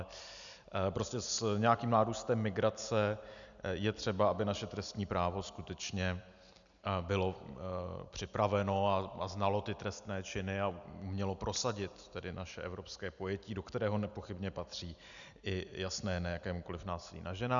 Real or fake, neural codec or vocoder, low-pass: real; none; 7.2 kHz